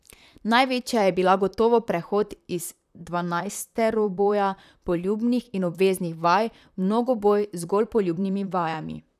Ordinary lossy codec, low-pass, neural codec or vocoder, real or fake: none; 14.4 kHz; vocoder, 44.1 kHz, 128 mel bands, Pupu-Vocoder; fake